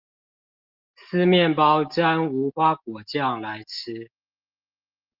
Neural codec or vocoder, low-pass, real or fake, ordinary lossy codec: none; 5.4 kHz; real; Opus, 32 kbps